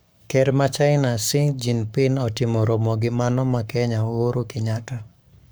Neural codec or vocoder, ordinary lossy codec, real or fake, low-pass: codec, 44.1 kHz, 7.8 kbps, Pupu-Codec; none; fake; none